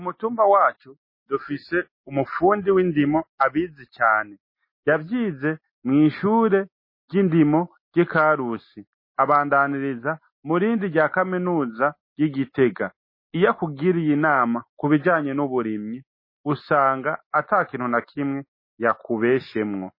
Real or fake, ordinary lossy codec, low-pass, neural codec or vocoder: real; MP3, 24 kbps; 5.4 kHz; none